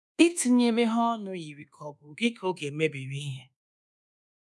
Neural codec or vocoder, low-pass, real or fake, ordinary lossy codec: codec, 24 kHz, 1.2 kbps, DualCodec; none; fake; none